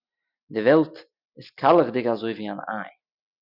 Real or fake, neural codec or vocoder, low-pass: real; none; 5.4 kHz